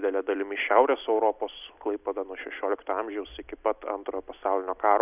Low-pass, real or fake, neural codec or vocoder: 3.6 kHz; real; none